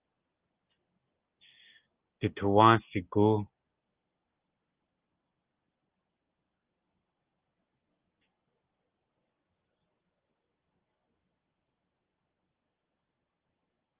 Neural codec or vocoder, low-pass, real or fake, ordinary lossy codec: none; 3.6 kHz; real; Opus, 32 kbps